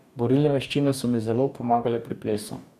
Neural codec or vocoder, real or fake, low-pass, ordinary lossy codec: codec, 44.1 kHz, 2.6 kbps, DAC; fake; 14.4 kHz; none